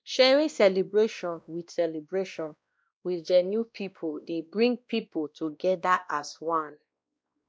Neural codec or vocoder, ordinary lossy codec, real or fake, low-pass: codec, 16 kHz, 1 kbps, X-Codec, WavLM features, trained on Multilingual LibriSpeech; none; fake; none